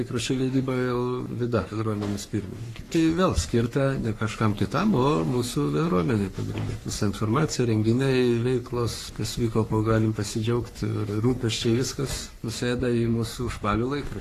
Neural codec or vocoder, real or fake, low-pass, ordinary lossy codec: codec, 44.1 kHz, 3.4 kbps, Pupu-Codec; fake; 14.4 kHz; AAC, 48 kbps